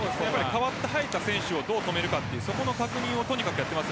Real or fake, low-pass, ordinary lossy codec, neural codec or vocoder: real; none; none; none